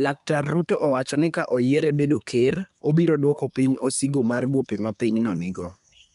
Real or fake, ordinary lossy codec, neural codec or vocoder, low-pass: fake; none; codec, 24 kHz, 1 kbps, SNAC; 10.8 kHz